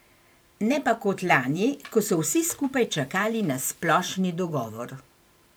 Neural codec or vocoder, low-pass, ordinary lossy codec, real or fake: vocoder, 44.1 kHz, 128 mel bands every 512 samples, BigVGAN v2; none; none; fake